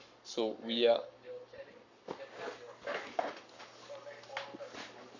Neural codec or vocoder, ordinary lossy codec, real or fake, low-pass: codec, 44.1 kHz, 7.8 kbps, Pupu-Codec; none; fake; 7.2 kHz